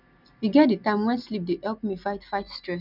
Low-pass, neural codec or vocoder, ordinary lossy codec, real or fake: 5.4 kHz; none; none; real